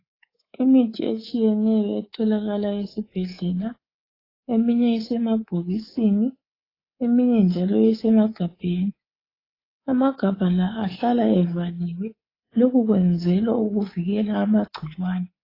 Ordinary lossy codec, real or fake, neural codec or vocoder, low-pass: AAC, 24 kbps; fake; codec, 24 kHz, 3.1 kbps, DualCodec; 5.4 kHz